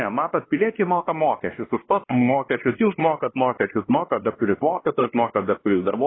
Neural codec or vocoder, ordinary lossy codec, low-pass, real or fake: codec, 16 kHz, 1 kbps, X-Codec, WavLM features, trained on Multilingual LibriSpeech; AAC, 16 kbps; 7.2 kHz; fake